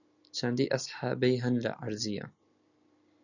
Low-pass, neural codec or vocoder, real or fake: 7.2 kHz; none; real